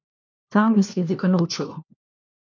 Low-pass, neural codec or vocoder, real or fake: 7.2 kHz; codec, 16 kHz, 1 kbps, FunCodec, trained on LibriTTS, 50 frames a second; fake